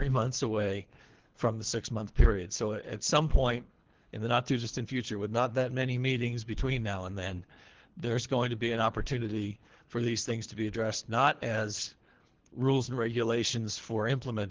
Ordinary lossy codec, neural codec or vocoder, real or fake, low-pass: Opus, 16 kbps; codec, 24 kHz, 3 kbps, HILCodec; fake; 7.2 kHz